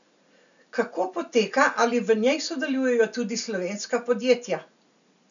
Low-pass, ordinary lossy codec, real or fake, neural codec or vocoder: 7.2 kHz; none; real; none